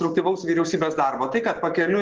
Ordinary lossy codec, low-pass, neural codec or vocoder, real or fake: Opus, 16 kbps; 7.2 kHz; none; real